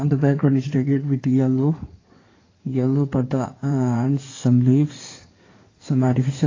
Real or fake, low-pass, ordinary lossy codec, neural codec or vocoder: fake; 7.2 kHz; AAC, 32 kbps; codec, 16 kHz in and 24 kHz out, 2.2 kbps, FireRedTTS-2 codec